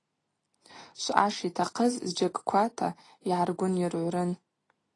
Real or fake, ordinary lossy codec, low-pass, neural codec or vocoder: real; AAC, 32 kbps; 10.8 kHz; none